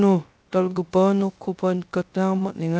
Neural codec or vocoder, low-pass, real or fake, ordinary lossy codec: codec, 16 kHz, 0.3 kbps, FocalCodec; none; fake; none